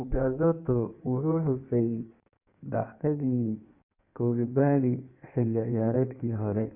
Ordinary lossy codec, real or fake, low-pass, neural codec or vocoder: none; fake; 3.6 kHz; codec, 16 kHz in and 24 kHz out, 1.1 kbps, FireRedTTS-2 codec